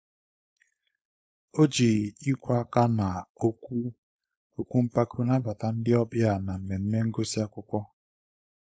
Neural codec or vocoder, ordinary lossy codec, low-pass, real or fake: codec, 16 kHz, 4.8 kbps, FACodec; none; none; fake